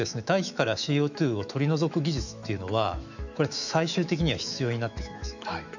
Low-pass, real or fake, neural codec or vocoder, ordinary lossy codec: 7.2 kHz; fake; autoencoder, 48 kHz, 128 numbers a frame, DAC-VAE, trained on Japanese speech; none